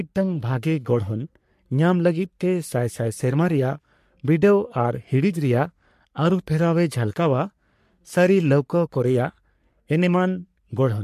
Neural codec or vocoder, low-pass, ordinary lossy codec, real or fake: codec, 44.1 kHz, 3.4 kbps, Pupu-Codec; 14.4 kHz; MP3, 64 kbps; fake